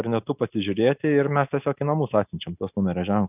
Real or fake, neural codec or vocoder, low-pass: real; none; 3.6 kHz